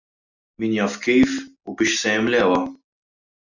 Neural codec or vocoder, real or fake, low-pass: none; real; 7.2 kHz